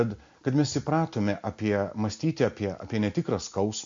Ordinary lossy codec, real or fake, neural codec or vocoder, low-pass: MP3, 48 kbps; real; none; 7.2 kHz